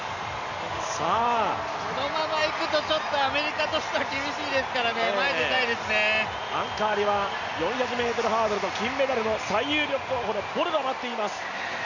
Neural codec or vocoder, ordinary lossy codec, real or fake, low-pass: none; none; real; 7.2 kHz